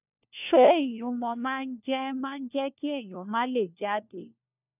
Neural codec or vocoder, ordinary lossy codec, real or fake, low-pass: codec, 16 kHz, 1 kbps, FunCodec, trained on LibriTTS, 50 frames a second; none; fake; 3.6 kHz